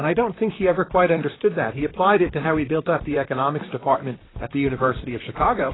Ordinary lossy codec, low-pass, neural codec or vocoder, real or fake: AAC, 16 kbps; 7.2 kHz; vocoder, 44.1 kHz, 128 mel bands, Pupu-Vocoder; fake